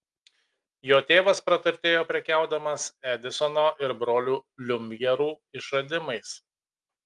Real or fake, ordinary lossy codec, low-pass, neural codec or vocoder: real; Opus, 32 kbps; 10.8 kHz; none